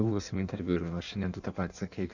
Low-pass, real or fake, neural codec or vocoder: 7.2 kHz; fake; codec, 16 kHz in and 24 kHz out, 1.1 kbps, FireRedTTS-2 codec